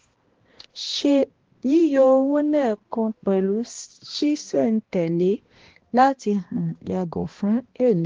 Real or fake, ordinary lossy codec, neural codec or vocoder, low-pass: fake; Opus, 16 kbps; codec, 16 kHz, 1 kbps, X-Codec, HuBERT features, trained on balanced general audio; 7.2 kHz